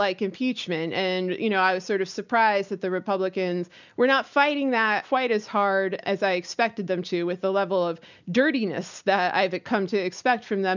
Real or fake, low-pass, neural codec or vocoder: real; 7.2 kHz; none